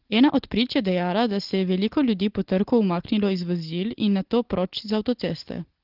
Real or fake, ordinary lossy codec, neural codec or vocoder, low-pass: real; Opus, 16 kbps; none; 5.4 kHz